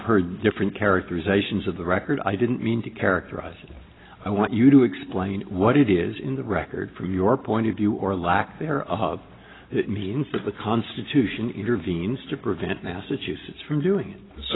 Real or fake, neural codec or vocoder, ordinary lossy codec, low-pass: real; none; AAC, 16 kbps; 7.2 kHz